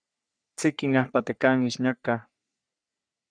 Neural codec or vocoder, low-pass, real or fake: codec, 44.1 kHz, 3.4 kbps, Pupu-Codec; 9.9 kHz; fake